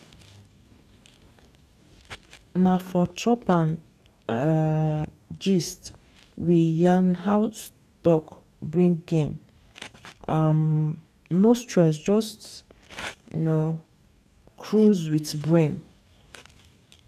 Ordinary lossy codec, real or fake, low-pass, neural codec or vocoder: none; fake; 14.4 kHz; codec, 44.1 kHz, 2.6 kbps, DAC